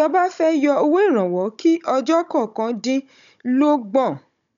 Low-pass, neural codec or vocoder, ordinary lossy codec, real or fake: 7.2 kHz; codec, 16 kHz, 16 kbps, FunCodec, trained on Chinese and English, 50 frames a second; none; fake